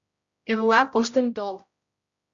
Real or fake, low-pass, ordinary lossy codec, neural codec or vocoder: fake; 7.2 kHz; Opus, 64 kbps; codec, 16 kHz, 0.5 kbps, X-Codec, HuBERT features, trained on general audio